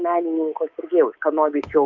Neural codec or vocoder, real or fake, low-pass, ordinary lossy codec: codec, 24 kHz, 3.1 kbps, DualCodec; fake; 7.2 kHz; Opus, 24 kbps